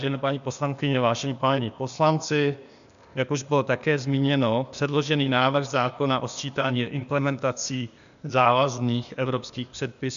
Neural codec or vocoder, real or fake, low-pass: codec, 16 kHz, 0.8 kbps, ZipCodec; fake; 7.2 kHz